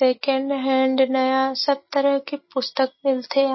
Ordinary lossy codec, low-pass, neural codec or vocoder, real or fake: MP3, 24 kbps; 7.2 kHz; none; real